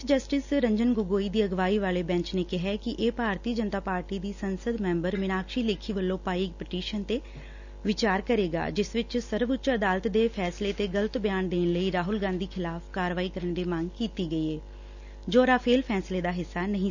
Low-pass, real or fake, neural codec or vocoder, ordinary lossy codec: 7.2 kHz; real; none; none